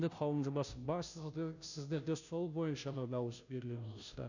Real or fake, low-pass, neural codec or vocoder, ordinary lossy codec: fake; 7.2 kHz; codec, 16 kHz, 0.5 kbps, FunCodec, trained on Chinese and English, 25 frames a second; none